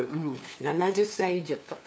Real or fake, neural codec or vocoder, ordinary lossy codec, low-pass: fake; codec, 16 kHz, 2 kbps, FunCodec, trained on LibriTTS, 25 frames a second; none; none